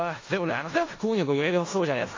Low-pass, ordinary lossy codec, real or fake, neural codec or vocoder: 7.2 kHz; AAC, 32 kbps; fake; codec, 16 kHz in and 24 kHz out, 0.4 kbps, LongCat-Audio-Codec, four codebook decoder